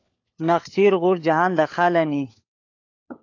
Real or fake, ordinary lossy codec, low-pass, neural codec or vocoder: fake; AAC, 48 kbps; 7.2 kHz; codec, 16 kHz, 4 kbps, FunCodec, trained on LibriTTS, 50 frames a second